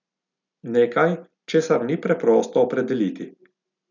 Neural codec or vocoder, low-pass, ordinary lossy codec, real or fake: none; 7.2 kHz; none; real